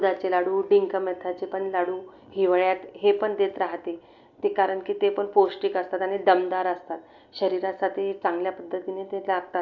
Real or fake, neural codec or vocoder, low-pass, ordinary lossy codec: real; none; 7.2 kHz; none